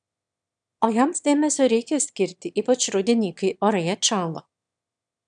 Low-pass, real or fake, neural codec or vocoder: 9.9 kHz; fake; autoencoder, 22.05 kHz, a latent of 192 numbers a frame, VITS, trained on one speaker